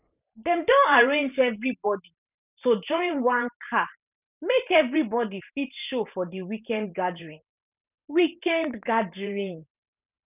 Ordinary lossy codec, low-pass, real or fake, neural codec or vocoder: none; 3.6 kHz; fake; vocoder, 44.1 kHz, 128 mel bands every 512 samples, BigVGAN v2